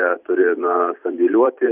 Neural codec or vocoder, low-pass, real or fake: vocoder, 44.1 kHz, 128 mel bands every 512 samples, BigVGAN v2; 3.6 kHz; fake